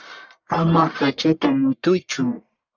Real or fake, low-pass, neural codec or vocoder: fake; 7.2 kHz; codec, 44.1 kHz, 1.7 kbps, Pupu-Codec